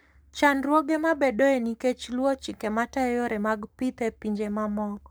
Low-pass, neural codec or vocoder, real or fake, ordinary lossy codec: none; codec, 44.1 kHz, 7.8 kbps, Pupu-Codec; fake; none